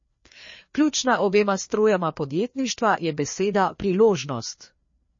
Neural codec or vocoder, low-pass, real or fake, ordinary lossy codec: codec, 16 kHz, 2 kbps, FreqCodec, larger model; 7.2 kHz; fake; MP3, 32 kbps